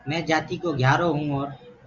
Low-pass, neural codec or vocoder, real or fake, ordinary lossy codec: 7.2 kHz; none; real; Opus, 64 kbps